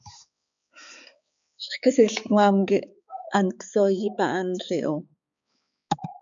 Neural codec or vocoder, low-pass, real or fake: codec, 16 kHz, 4 kbps, X-Codec, HuBERT features, trained on balanced general audio; 7.2 kHz; fake